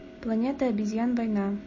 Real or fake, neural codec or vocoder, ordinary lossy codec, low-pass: real; none; MP3, 32 kbps; 7.2 kHz